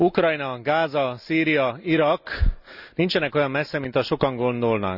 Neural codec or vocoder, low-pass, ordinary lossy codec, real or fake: none; 5.4 kHz; none; real